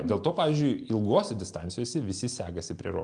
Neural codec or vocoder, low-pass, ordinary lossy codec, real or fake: none; 9.9 kHz; Opus, 32 kbps; real